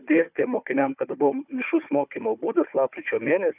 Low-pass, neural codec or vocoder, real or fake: 3.6 kHz; codec, 16 kHz, 4 kbps, FunCodec, trained on Chinese and English, 50 frames a second; fake